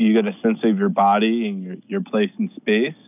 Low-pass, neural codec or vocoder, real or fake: 3.6 kHz; none; real